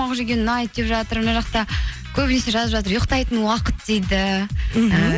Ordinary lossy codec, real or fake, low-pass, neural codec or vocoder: none; real; none; none